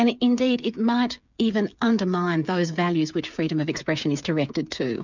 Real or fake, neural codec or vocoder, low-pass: fake; codec, 16 kHz, 8 kbps, FreqCodec, smaller model; 7.2 kHz